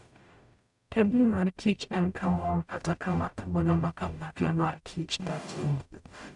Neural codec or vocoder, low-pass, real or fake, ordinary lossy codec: codec, 44.1 kHz, 0.9 kbps, DAC; 10.8 kHz; fake; none